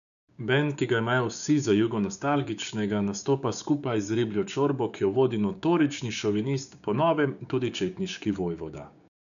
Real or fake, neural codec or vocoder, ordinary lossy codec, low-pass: fake; codec, 16 kHz, 6 kbps, DAC; none; 7.2 kHz